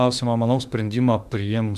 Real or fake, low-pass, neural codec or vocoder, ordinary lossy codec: fake; 14.4 kHz; autoencoder, 48 kHz, 32 numbers a frame, DAC-VAE, trained on Japanese speech; Opus, 64 kbps